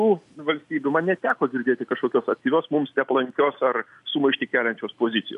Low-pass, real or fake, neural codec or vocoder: 10.8 kHz; real; none